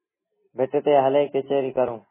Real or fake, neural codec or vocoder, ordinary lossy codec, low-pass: real; none; MP3, 16 kbps; 3.6 kHz